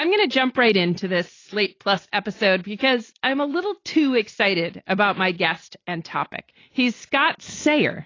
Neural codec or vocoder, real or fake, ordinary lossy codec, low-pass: none; real; AAC, 32 kbps; 7.2 kHz